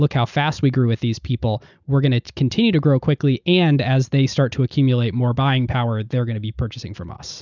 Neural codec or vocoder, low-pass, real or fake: none; 7.2 kHz; real